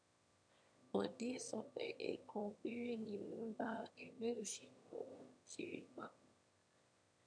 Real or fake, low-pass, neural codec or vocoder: fake; 9.9 kHz; autoencoder, 22.05 kHz, a latent of 192 numbers a frame, VITS, trained on one speaker